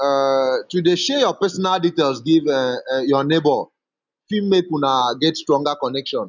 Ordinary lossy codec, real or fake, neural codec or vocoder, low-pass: none; real; none; 7.2 kHz